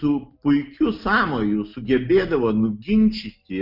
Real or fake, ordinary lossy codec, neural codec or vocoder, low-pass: real; MP3, 48 kbps; none; 5.4 kHz